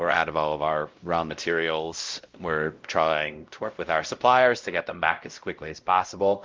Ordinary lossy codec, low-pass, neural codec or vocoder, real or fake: Opus, 32 kbps; 7.2 kHz; codec, 16 kHz, 0.5 kbps, X-Codec, WavLM features, trained on Multilingual LibriSpeech; fake